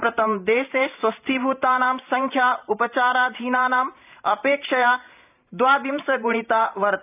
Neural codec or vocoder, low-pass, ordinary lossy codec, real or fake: vocoder, 44.1 kHz, 128 mel bands every 512 samples, BigVGAN v2; 3.6 kHz; none; fake